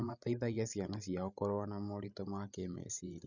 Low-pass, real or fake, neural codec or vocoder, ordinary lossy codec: 7.2 kHz; fake; codec, 16 kHz, 16 kbps, FreqCodec, larger model; none